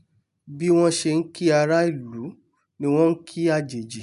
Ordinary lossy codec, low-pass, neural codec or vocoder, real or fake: none; 10.8 kHz; none; real